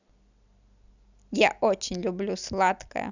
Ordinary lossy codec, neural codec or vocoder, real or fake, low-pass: none; none; real; 7.2 kHz